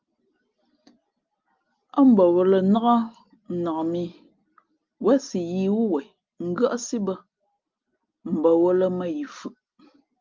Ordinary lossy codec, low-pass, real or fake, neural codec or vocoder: Opus, 32 kbps; 7.2 kHz; real; none